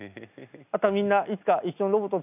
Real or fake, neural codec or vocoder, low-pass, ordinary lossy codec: real; none; 3.6 kHz; none